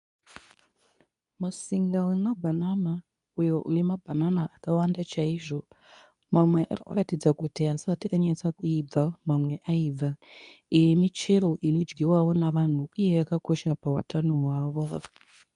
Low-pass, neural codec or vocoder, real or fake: 10.8 kHz; codec, 24 kHz, 0.9 kbps, WavTokenizer, medium speech release version 2; fake